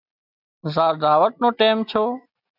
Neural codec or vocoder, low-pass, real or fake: none; 5.4 kHz; real